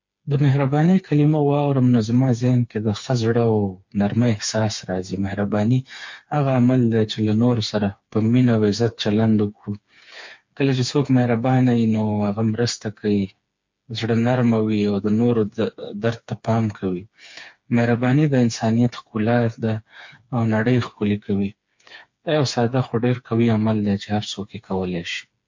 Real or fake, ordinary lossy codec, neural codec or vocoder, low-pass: fake; MP3, 48 kbps; codec, 16 kHz, 4 kbps, FreqCodec, smaller model; 7.2 kHz